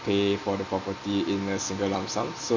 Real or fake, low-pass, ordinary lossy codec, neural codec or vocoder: real; 7.2 kHz; Opus, 64 kbps; none